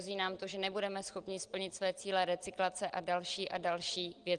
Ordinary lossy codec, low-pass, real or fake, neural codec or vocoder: Opus, 24 kbps; 9.9 kHz; real; none